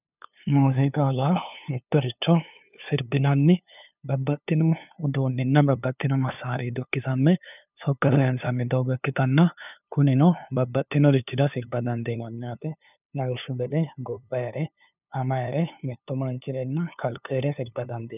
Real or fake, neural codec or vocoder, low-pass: fake; codec, 16 kHz, 2 kbps, FunCodec, trained on LibriTTS, 25 frames a second; 3.6 kHz